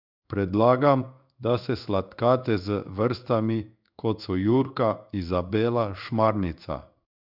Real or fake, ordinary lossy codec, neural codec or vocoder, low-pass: fake; none; codec, 16 kHz in and 24 kHz out, 1 kbps, XY-Tokenizer; 5.4 kHz